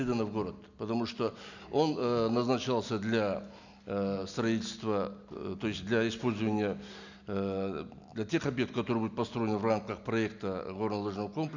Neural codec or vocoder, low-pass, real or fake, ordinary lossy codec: none; 7.2 kHz; real; none